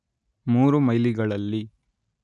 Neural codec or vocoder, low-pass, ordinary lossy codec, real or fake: none; 10.8 kHz; none; real